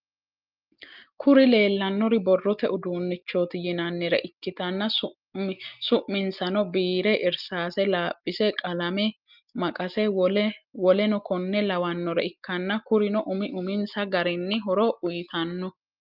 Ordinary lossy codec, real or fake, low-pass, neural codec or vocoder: Opus, 32 kbps; real; 5.4 kHz; none